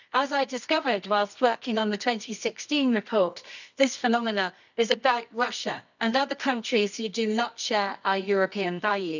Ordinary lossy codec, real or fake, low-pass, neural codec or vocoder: none; fake; 7.2 kHz; codec, 24 kHz, 0.9 kbps, WavTokenizer, medium music audio release